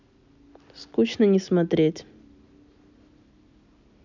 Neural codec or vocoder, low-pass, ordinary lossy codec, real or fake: none; 7.2 kHz; none; real